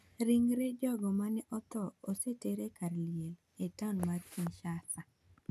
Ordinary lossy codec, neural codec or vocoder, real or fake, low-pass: none; none; real; 14.4 kHz